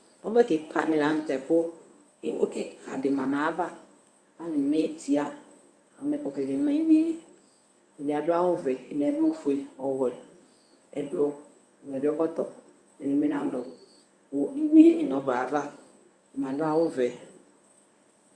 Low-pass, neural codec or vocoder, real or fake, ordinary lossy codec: 9.9 kHz; codec, 24 kHz, 0.9 kbps, WavTokenizer, medium speech release version 1; fake; Opus, 64 kbps